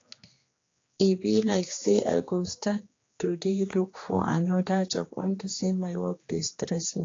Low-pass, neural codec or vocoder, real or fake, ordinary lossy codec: 7.2 kHz; codec, 16 kHz, 2 kbps, X-Codec, HuBERT features, trained on general audio; fake; AAC, 32 kbps